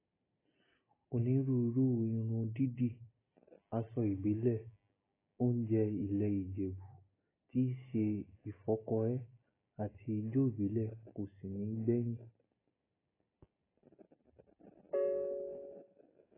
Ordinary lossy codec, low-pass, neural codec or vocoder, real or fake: AAC, 16 kbps; 3.6 kHz; none; real